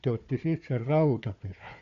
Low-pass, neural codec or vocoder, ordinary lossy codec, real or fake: 7.2 kHz; codec, 16 kHz, 4 kbps, FunCodec, trained on Chinese and English, 50 frames a second; none; fake